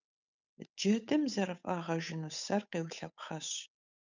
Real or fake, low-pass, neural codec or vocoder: fake; 7.2 kHz; codec, 16 kHz, 8 kbps, FunCodec, trained on Chinese and English, 25 frames a second